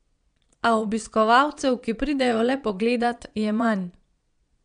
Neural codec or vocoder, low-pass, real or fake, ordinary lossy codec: vocoder, 22.05 kHz, 80 mel bands, Vocos; 9.9 kHz; fake; none